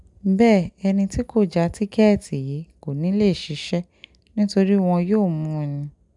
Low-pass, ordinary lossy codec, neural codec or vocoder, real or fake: 10.8 kHz; none; none; real